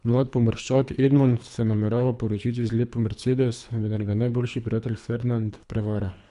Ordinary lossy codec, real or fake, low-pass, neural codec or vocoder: none; fake; 10.8 kHz; codec, 24 kHz, 3 kbps, HILCodec